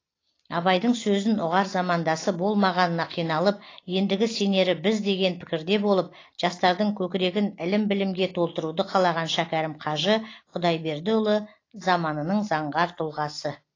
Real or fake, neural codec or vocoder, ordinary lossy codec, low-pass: real; none; AAC, 32 kbps; 7.2 kHz